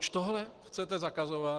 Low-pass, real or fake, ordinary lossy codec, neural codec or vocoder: 10.8 kHz; real; Opus, 16 kbps; none